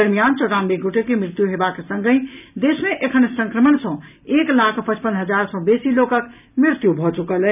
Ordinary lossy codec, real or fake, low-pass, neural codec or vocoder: none; real; 3.6 kHz; none